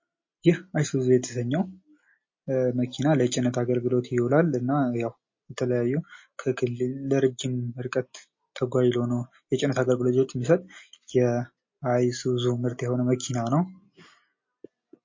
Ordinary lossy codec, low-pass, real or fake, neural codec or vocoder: MP3, 32 kbps; 7.2 kHz; real; none